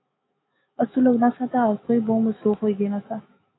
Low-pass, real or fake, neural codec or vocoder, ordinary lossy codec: 7.2 kHz; fake; autoencoder, 48 kHz, 128 numbers a frame, DAC-VAE, trained on Japanese speech; AAC, 16 kbps